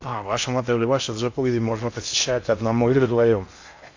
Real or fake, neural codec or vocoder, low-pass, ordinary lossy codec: fake; codec, 16 kHz in and 24 kHz out, 0.6 kbps, FocalCodec, streaming, 2048 codes; 7.2 kHz; AAC, 48 kbps